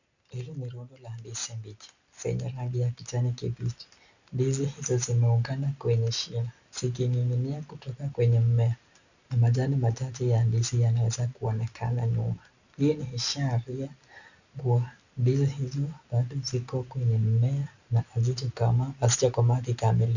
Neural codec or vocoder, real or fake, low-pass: none; real; 7.2 kHz